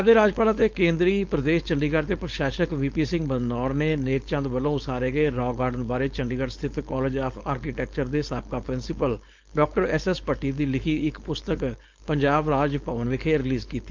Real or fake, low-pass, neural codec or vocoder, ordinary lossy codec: fake; 7.2 kHz; codec, 16 kHz, 4.8 kbps, FACodec; Opus, 32 kbps